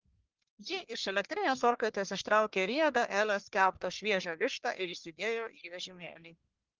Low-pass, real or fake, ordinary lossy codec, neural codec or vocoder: 7.2 kHz; fake; Opus, 16 kbps; codec, 44.1 kHz, 1.7 kbps, Pupu-Codec